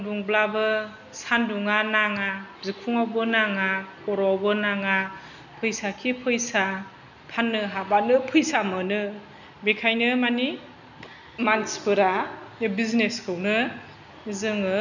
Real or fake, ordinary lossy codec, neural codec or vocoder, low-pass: real; none; none; 7.2 kHz